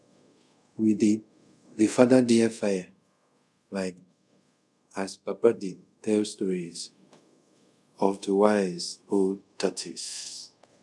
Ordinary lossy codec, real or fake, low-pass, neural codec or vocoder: none; fake; none; codec, 24 kHz, 0.5 kbps, DualCodec